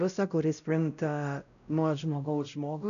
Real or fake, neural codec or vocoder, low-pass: fake; codec, 16 kHz, 0.5 kbps, X-Codec, WavLM features, trained on Multilingual LibriSpeech; 7.2 kHz